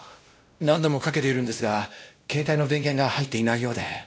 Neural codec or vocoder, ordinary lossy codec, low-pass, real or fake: codec, 16 kHz, 1 kbps, X-Codec, WavLM features, trained on Multilingual LibriSpeech; none; none; fake